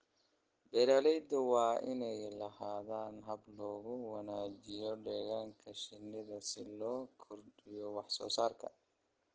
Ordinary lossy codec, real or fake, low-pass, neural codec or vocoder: Opus, 16 kbps; real; 7.2 kHz; none